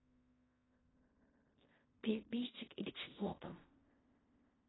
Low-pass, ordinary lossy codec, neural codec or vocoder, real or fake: 7.2 kHz; AAC, 16 kbps; codec, 16 kHz in and 24 kHz out, 0.4 kbps, LongCat-Audio-Codec, fine tuned four codebook decoder; fake